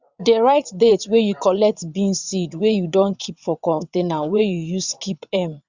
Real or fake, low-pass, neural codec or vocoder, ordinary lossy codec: fake; 7.2 kHz; vocoder, 22.05 kHz, 80 mel bands, WaveNeXt; Opus, 64 kbps